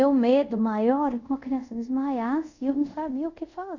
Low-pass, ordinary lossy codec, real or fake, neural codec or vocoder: 7.2 kHz; none; fake; codec, 24 kHz, 0.5 kbps, DualCodec